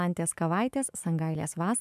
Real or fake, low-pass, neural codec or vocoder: fake; 14.4 kHz; autoencoder, 48 kHz, 128 numbers a frame, DAC-VAE, trained on Japanese speech